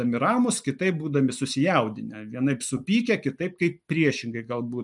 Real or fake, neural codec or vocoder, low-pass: real; none; 10.8 kHz